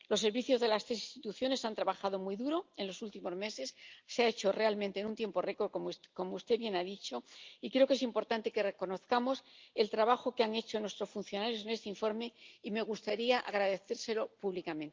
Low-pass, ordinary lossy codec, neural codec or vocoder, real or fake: 7.2 kHz; Opus, 24 kbps; none; real